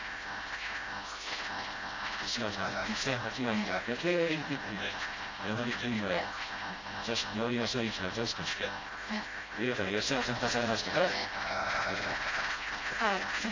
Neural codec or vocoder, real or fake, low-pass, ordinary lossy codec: codec, 16 kHz, 0.5 kbps, FreqCodec, smaller model; fake; 7.2 kHz; AAC, 32 kbps